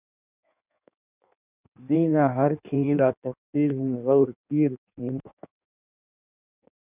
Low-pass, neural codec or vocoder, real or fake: 3.6 kHz; codec, 16 kHz in and 24 kHz out, 1.1 kbps, FireRedTTS-2 codec; fake